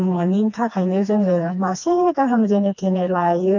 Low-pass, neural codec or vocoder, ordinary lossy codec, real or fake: 7.2 kHz; codec, 16 kHz, 2 kbps, FreqCodec, smaller model; none; fake